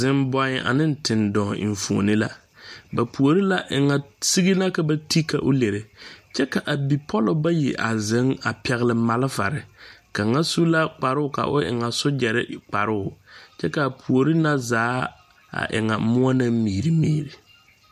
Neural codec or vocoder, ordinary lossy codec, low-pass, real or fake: none; MP3, 96 kbps; 14.4 kHz; real